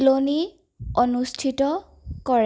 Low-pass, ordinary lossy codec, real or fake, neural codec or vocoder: none; none; real; none